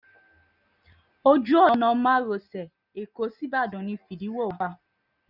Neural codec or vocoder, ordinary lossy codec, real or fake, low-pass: none; none; real; 5.4 kHz